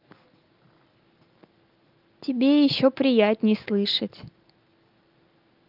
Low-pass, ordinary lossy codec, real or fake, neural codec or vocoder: 5.4 kHz; Opus, 24 kbps; real; none